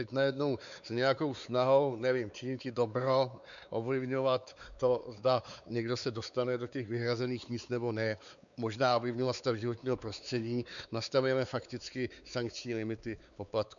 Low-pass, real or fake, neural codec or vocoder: 7.2 kHz; fake; codec, 16 kHz, 4 kbps, X-Codec, WavLM features, trained on Multilingual LibriSpeech